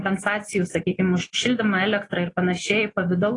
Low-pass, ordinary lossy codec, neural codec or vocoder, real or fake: 10.8 kHz; AAC, 32 kbps; none; real